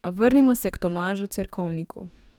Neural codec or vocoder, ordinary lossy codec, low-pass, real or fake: codec, 44.1 kHz, 2.6 kbps, DAC; none; 19.8 kHz; fake